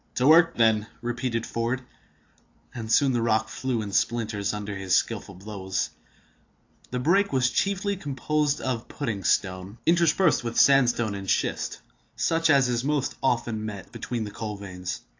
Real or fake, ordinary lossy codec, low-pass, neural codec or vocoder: real; AAC, 48 kbps; 7.2 kHz; none